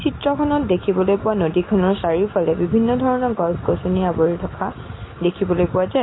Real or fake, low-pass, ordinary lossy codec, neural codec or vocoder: real; 7.2 kHz; AAC, 16 kbps; none